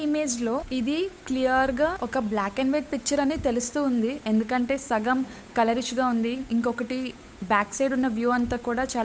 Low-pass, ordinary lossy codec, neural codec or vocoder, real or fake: none; none; codec, 16 kHz, 8 kbps, FunCodec, trained on Chinese and English, 25 frames a second; fake